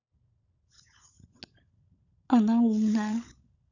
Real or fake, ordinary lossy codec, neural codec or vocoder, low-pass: fake; none; codec, 16 kHz, 16 kbps, FunCodec, trained on LibriTTS, 50 frames a second; 7.2 kHz